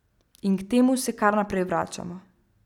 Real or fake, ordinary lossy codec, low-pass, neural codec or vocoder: real; none; 19.8 kHz; none